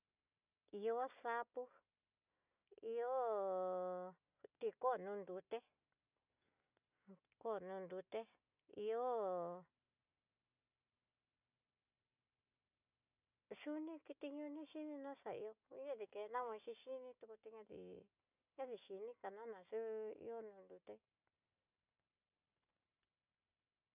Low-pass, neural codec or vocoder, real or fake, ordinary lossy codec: 3.6 kHz; none; real; none